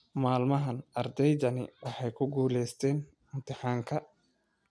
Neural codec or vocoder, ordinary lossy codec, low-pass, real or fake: none; none; none; real